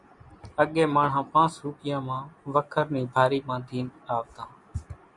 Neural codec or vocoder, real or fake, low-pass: none; real; 10.8 kHz